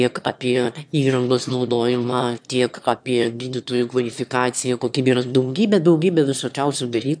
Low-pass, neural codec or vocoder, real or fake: 9.9 kHz; autoencoder, 22.05 kHz, a latent of 192 numbers a frame, VITS, trained on one speaker; fake